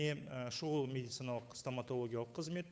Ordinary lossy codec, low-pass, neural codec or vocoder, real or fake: none; none; codec, 16 kHz, 8 kbps, FunCodec, trained on Chinese and English, 25 frames a second; fake